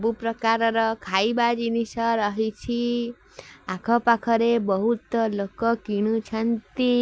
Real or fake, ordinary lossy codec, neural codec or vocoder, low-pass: real; none; none; none